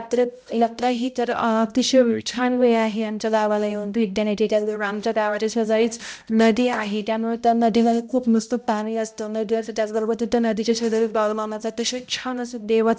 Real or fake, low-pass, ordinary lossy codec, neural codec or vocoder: fake; none; none; codec, 16 kHz, 0.5 kbps, X-Codec, HuBERT features, trained on balanced general audio